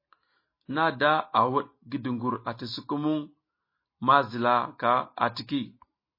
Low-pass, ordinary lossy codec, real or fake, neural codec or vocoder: 5.4 kHz; MP3, 24 kbps; real; none